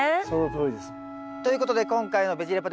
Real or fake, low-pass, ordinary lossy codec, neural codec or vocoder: real; none; none; none